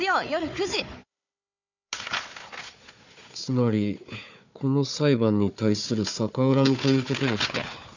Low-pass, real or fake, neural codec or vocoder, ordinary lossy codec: 7.2 kHz; fake; codec, 16 kHz, 4 kbps, FunCodec, trained on Chinese and English, 50 frames a second; none